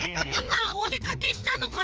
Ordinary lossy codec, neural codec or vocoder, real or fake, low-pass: none; codec, 16 kHz, 2 kbps, FreqCodec, larger model; fake; none